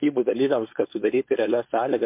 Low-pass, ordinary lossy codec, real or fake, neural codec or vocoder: 3.6 kHz; MP3, 32 kbps; fake; codec, 16 kHz, 4.8 kbps, FACodec